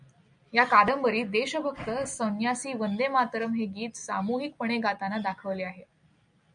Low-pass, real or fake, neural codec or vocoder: 9.9 kHz; real; none